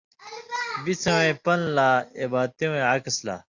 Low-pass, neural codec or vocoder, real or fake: 7.2 kHz; none; real